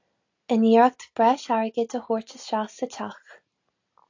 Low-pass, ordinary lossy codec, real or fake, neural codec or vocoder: 7.2 kHz; AAC, 48 kbps; real; none